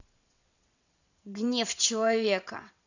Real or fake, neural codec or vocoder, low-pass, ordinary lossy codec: fake; vocoder, 22.05 kHz, 80 mel bands, WaveNeXt; 7.2 kHz; none